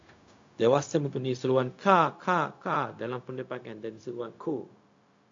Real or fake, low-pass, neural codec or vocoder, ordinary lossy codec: fake; 7.2 kHz; codec, 16 kHz, 0.4 kbps, LongCat-Audio-Codec; AAC, 48 kbps